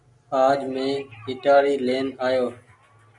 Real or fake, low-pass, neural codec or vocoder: real; 10.8 kHz; none